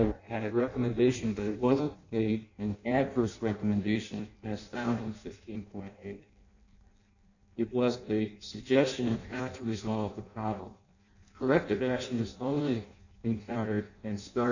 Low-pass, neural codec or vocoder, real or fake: 7.2 kHz; codec, 16 kHz in and 24 kHz out, 0.6 kbps, FireRedTTS-2 codec; fake